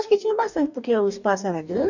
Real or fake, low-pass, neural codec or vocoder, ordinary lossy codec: fake; 7.2 kHz; codec, 44.1 kHz, 2.6 kbps, DAC; none